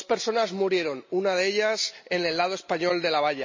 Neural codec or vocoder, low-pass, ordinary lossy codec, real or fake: none; 7.2 kHz; MP3, 32 kbps; real